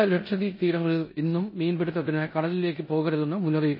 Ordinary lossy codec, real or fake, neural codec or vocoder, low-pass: MP3, 24 kbps; fake; codec, 16 kHz in and 24 kHz out, 0.9 kbps, LongCat-Audio-Codec, four codebook decoder; 5.4 kHz